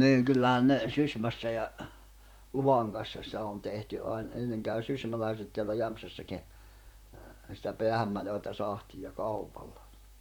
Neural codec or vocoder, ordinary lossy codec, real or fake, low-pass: vocoder, 44.1 kHz, 128 mel bands, Pupu-Vocoder; none; fake; 19.8 kHz